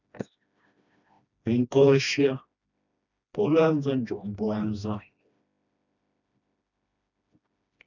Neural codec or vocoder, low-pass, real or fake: codec, 16 kHz, 1 kbps, FreqCodec, smaller model; 7.2 kHz; fake